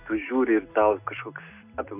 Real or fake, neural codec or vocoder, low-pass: real; none; 3.6 kHz